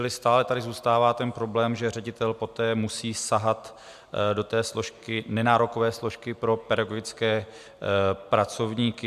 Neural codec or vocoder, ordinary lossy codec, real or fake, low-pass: none; MP3, 96 kbps; real; 14.4 kHz